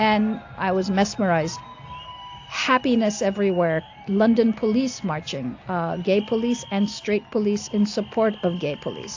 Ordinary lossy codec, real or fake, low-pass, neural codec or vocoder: AAC, 48 kbps; real; 7.2 kHz; none